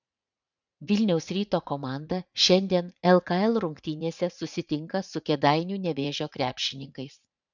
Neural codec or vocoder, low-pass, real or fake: vocoder, 44.1 kHz, 80 mel bands, Vocos; 7.2 kHz; fake